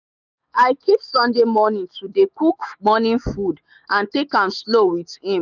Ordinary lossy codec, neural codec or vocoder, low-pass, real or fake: none; autoencoder, 48 kHz, 128 numbers a frame, DAC-VAE, trained on Japanese speech; 7.2 kHz; fake